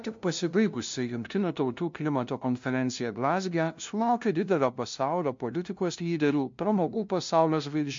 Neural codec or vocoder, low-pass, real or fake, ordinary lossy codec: codec, 16 kHz, 0.5 kbps, FunCodec, trained on LibriTTS, 25 frames a second; 7.2 kHz; fake; MP3, 48 kbps